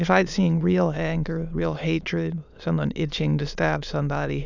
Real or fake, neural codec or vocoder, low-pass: fake; autoencoder, 22.05 kHz, a latent of 192 numbers a frame, VITS, trained on many speakers; 7.2 kHz